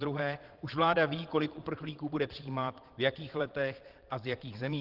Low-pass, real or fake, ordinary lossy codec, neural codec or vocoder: 5.4 kHz; fake; Opus, 16 kbps; vocoder, 22.05 kHz, 80 mel bands, Vocos